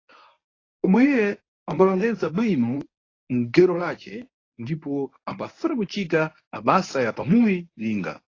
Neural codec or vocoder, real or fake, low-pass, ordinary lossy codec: codec, 24 kHz, 0.9 kbps, WavTokenizer, medium speech release version 1; fake; 7.2 kHz; AAC, 32 kbps